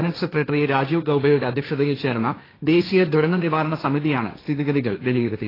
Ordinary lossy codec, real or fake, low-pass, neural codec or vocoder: AAC, 24 kbps; fake; 5.4 kHz; codec, 16 kHz, 1.1 kbps, Voila-Tokenizer